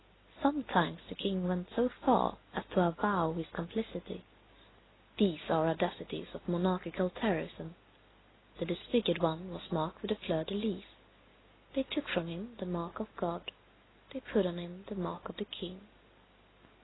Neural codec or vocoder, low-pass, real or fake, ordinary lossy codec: none; 7.2 kHz; real; AAC, 16 kbps